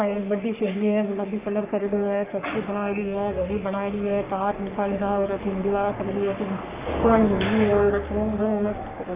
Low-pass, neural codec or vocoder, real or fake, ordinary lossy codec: 3.6 kHz; codec, 44.1 kHz, 3.4 kbps, Pupu-Codec; fake; Opus, 64 kbps